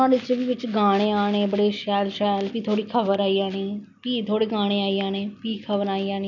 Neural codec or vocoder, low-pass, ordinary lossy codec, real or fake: none; 7.2 kHz; none; real